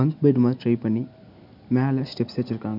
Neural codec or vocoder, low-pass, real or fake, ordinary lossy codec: none; 5.4 kHz; real; none